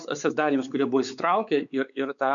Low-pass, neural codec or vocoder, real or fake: 7.2 kHz; codec, 16 kHz, 4 kbps, X-Codec, WavLM features, trained on Multilingual LibriSpeech; fake